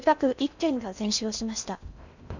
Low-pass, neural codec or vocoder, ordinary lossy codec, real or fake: 7.2 kHz; codec, 16 kHz in and 24 kHz out, 0.6 kbps, FocalCodec, streaming, 4096 codes; none; fake